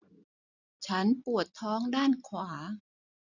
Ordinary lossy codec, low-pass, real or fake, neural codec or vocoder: none; 7.2 kHz; real; none